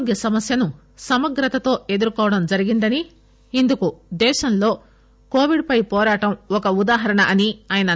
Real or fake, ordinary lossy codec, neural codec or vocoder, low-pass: real; none; none; none